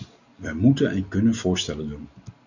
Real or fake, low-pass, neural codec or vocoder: real; 7.2 kHz; none